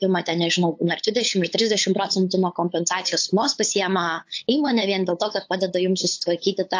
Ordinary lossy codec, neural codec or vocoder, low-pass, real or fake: AAC, 48 kbps; codec, 16 kHz, 8 kbps, FunCodec, trained on LibriTTS, 25 frames a second; 7.2 kHz; fake